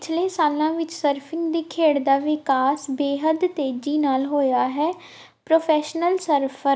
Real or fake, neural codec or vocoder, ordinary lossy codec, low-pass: real; none; none; none